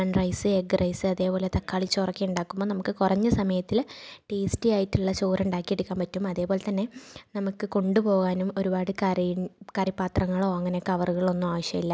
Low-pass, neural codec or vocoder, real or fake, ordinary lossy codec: none; none; real; none